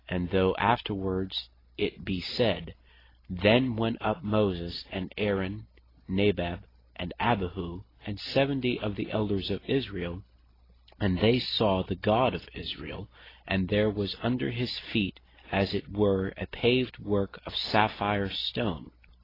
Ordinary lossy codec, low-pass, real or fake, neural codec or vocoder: AAC, 24 kbps; 5.4 kHz; real; none